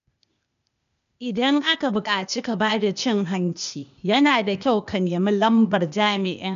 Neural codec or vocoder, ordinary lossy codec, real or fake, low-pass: codec, 16 kHz, 0.8 kbps, ZipCodec; MP3, 96 kbps; fake; 7.2 kHz